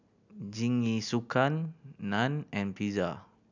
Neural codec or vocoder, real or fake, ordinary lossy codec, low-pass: none; real; none; 7.2 kHz